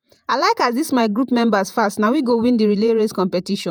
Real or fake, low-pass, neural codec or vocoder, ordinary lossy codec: fake; none; vocoder, 48 kHz, 128 mel bands, Vocos; none